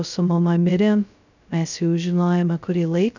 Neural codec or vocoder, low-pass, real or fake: codec, 16 kHz, 0.2 kbps, FocalCodec; 7.2 kHz; fake